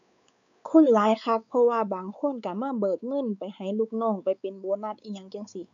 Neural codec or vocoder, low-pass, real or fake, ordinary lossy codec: codec, 16 kHz, 4 kbps, X-Codec, WavLM features, trained on Multilingual LibriSpeech; 7.2 kHz; fake; none